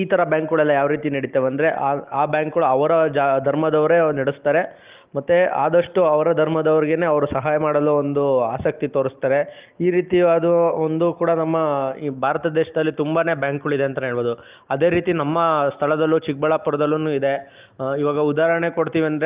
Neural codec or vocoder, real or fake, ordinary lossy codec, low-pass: none; real; Opus, 32 kbps; 3.6 kHz